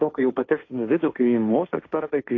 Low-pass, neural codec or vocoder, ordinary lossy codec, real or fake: 7.2 kHz; codec, 16 kHz, 1.1 kbps, Voila-Tokenizer; AAC, 32 kbps; fake